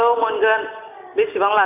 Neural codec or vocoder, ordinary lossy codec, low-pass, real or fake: none; none; 3.6 kHz; real